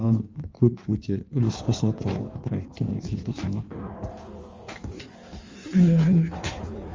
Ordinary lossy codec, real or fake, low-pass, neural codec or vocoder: Opus, 32 kbps; fake; 7.2 kHz; codec, 16 kHz in and 24 kHz out, 1.1 kbps, FireRedTTS-2 codec